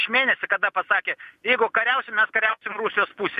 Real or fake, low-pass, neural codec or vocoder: real; 14.4 kHz; none